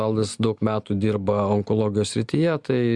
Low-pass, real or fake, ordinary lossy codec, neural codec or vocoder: 10.8 kHz; real; Opus, 64 kbps; none